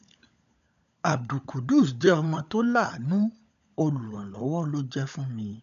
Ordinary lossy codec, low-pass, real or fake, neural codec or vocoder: none; 7.2 kHz; fake; codec, 16 kHz, 16 kbps, FunCodec, trained on LibriTTS, 50 frames a second